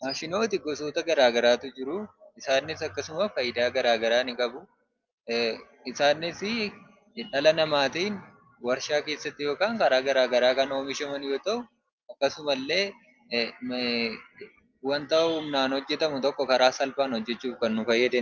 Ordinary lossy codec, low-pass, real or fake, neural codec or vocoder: Opus, 24 kbps; 7.2 kHz; real; none